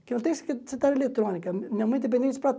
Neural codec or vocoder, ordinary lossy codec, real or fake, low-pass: none; none; real; none